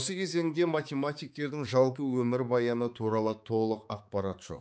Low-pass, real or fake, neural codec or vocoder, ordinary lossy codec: none; fake; codec, 16 kHz, 4 kbps, X-Codec, HuBERT features, trained on balanced general audio; none